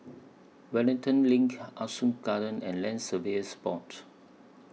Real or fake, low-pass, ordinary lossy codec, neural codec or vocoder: real; none; none; none